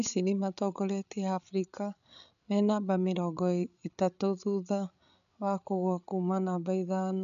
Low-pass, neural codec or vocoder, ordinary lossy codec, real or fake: 7.2 kHz; codec, 16 kHz, 8 kbps, FreqCodec, larger model; none; fake